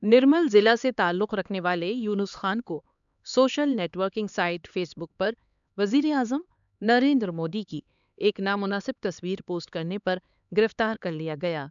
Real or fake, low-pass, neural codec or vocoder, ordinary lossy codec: fake; 7.2 kHz; codec, 16 kHz, 4 kbps, X-Codec, HuBERT features, trained on LibriSpeech; none